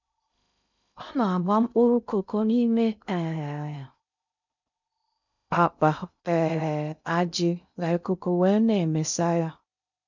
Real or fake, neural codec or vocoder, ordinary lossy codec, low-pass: fake; codec, 16 kHz in and 24 kHz out, 0.6 kbps, FocalCodec, streaming, 2048 codes; none; 7.2 kHz